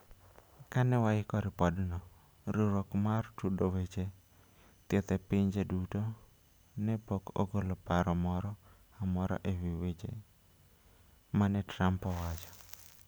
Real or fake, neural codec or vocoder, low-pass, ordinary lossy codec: real; none; none; none